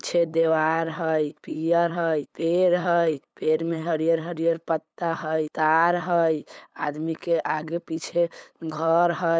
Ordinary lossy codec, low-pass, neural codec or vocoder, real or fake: none; none; codec, 16 kHz, 8 kbps, FunCodec, trained on LibriTTS, 25 frames a second; fake